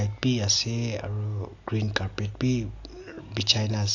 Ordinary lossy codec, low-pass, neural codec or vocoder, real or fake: AAC, 48 kbps; 7.2 kHz; none; real